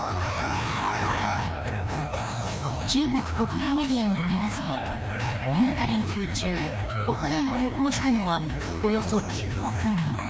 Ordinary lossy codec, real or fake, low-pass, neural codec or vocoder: none; fake; none; codec, 16 kHz, 1 kbps, FreqCodec, larger model